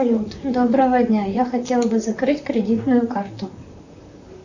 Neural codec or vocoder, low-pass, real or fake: vocoder, 44.1 kHz, 128 mel bands, Pupu-Vocoder; 7.2 kHz; fake